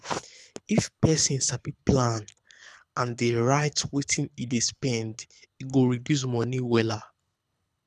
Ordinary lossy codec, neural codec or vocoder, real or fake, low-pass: none; codec, 24 kHz, 6 kbps, HILCodec; fake; none